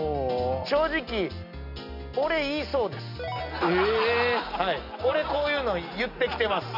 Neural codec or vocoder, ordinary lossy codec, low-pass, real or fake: none; none; 5.4 kHz; real